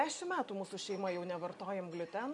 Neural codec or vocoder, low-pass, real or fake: none; 10.8 kHz; real